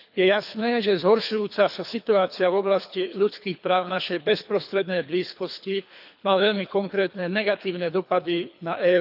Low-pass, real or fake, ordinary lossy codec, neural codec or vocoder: 5.4 kHz; fake; none; codec, 24 kHz, 3 kbps, HILCodec